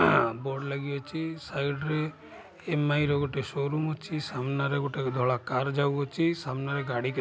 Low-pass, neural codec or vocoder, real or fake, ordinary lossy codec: none; none; real; none